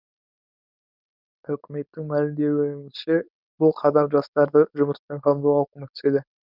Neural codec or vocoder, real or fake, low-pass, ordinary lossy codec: codec, 16 kHz, 4.8 kbps, FACodec; fake; 5.4 kHz; none